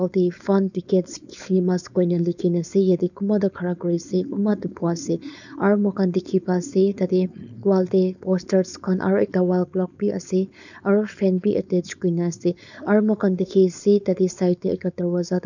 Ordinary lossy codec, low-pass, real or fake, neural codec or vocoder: none; 7.2 kHz; fake; codec, 16 kHz, 4.8 kbps, FACodec